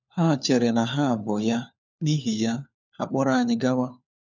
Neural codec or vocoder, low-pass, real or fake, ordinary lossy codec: codec, 16 kHz, 4 kbps, FunCodec, trained on LibriTTS, 50 frames a second; 7.2 kHz; fake; none